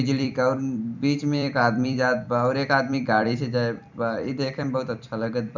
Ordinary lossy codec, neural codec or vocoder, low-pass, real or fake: none; vocoder, 44.1 kHz, 128 mel bands every 256 samples, BigVGAN v2; 7.2 kHz; fake